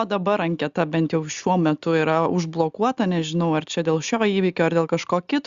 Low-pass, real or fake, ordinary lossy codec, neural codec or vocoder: 7.2 kHz; real; Opus, 64 kbps; none